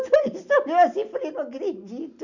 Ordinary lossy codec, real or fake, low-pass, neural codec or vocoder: none; real; 7.2 kHz; none